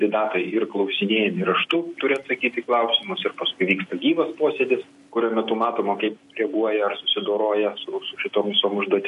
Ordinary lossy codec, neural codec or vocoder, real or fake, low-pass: MP3, 48 kbps; none; real; 14.4 kHz